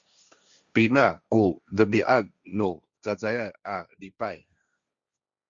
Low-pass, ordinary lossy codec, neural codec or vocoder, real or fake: 7.2 kHz; Opus, 64 kbps; codec, 16 kHz, 1.1 kbps, Voila-Tokenizer; fake